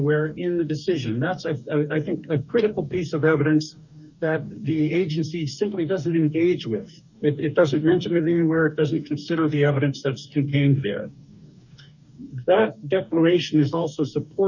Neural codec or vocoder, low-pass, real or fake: codec, 44.1 kHz, 2.6 kbps, DAC; 7.2 kHz; fake